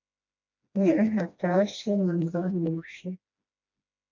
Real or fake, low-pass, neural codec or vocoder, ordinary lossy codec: fake; 7.2 kHz; codec, 16 kHz, 1 kbps, FreqCodec, smaller model; MP3, 48 kbps